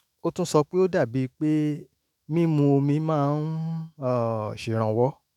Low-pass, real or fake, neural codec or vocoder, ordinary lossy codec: 19.8 kHz; fake; autoencoder, 48 kHz, 128 numbers a frame, DAC-VAE, trained on Japanese speech; none